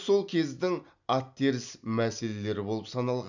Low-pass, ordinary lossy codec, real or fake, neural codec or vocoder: 7.2 kHz; none; real; none